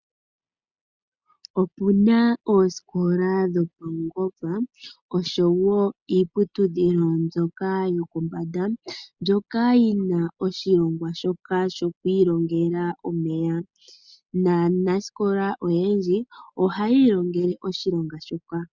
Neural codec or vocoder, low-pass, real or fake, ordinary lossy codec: none; 7.2 kHz; real; Opus, 64 kbps